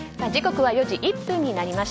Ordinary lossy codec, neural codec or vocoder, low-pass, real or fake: none; none; none; real